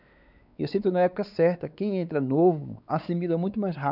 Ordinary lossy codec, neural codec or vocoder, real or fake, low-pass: none; codec, 16 kHz, 4 kbps, X-Codec, WavLM features, trained on Multilingual LibriSpeech; fake; 5.4 kHz